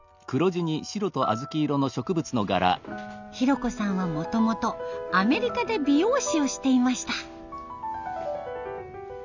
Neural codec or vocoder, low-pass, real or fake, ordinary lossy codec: none; 7.2 kHz; real; none